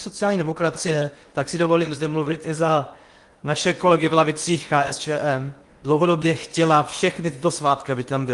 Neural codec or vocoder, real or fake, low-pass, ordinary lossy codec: codec, 16 kHz in and 24 kHz out, 0.8 kbps, FocalCodec, streaming, 65536 codes; fake; 10.8 kHz; Opus, 32 kbps